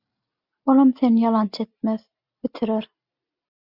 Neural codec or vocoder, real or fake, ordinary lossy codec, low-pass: none; real; Opus, 64 kbps; 5.4 kHz